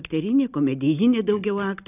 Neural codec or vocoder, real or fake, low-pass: none; real; 3.6 kHz